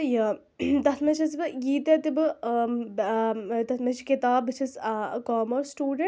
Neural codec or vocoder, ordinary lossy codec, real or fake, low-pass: none; none; real; none